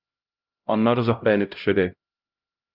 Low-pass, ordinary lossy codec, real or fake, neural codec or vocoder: 5.4 kHz; Opus, 32 kbps; fake; codec, 16 kHz, 0.5 kbps, X-Codec, HuBERT features, trained on LibriSpeech